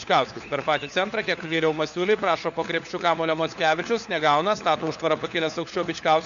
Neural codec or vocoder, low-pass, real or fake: codec, 16 kHz, 4 kbps, FunCodec, trained on LibriTTS, 50 frames a second; 7.2 kHz; fake